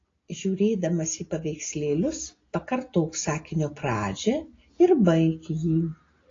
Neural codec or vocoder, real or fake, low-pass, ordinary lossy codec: none; real; 7.2 kHz; AAC, 32 kbps